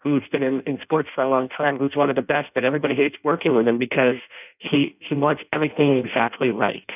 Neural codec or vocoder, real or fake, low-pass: codec, 16 kHz in and 24 kHz out, 0.6 kbps, FireRedTTS-2 codec; fake; 3.6 kHz